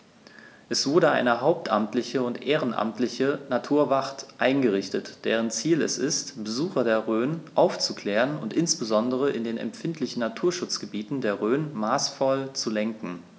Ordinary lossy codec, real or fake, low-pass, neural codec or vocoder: none; real; none; none